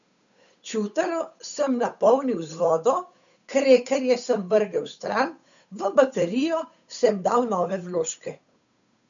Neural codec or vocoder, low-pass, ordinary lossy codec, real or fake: codec, 16 kHz, 8 kbps, FunCodec, trained on Chinese and English, 25 frames a second; 7.2 kHz; none; fake